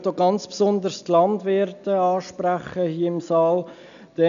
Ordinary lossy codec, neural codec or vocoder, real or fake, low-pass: none; none; real; 7.2 kHz